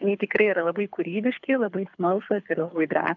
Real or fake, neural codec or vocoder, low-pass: fake; codec, 24 kHz, 6 kbps, HILCodec; 7.2 kHz